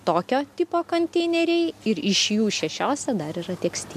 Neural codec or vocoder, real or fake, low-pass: none; real; 14.4 kHz